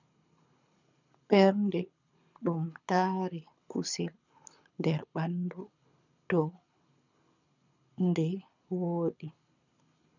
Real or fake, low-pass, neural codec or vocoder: fake; 7.2 kHz; codec, 24 kHz, 6 kbps, HILCodec